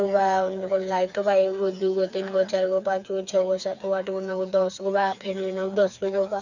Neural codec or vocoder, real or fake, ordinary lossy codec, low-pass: codec, 16 kHz, 4 kbps, FreqCodec, smaller model; fake; none; 7.2 kHz